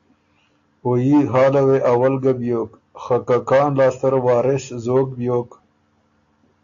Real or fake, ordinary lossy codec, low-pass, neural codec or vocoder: real; AAC, 64 kbps; 7.2 kHz; none